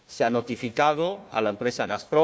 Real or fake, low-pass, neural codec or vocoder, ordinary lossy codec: fake; none; codec, 16 kHz, 1 kbps, FunCodec, trained on Chinese and English, 50 frames a second; none